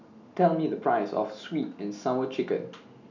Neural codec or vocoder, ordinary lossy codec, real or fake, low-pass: none; none; real; 7.2 kHz